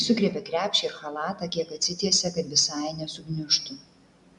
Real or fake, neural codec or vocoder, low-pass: real; none; 10.8 kHz